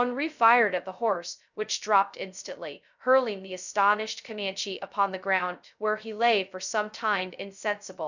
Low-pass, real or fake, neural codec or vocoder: 7.2 kHz; fake; codec, 16 kHz, 0.2 kbps, FocalCodec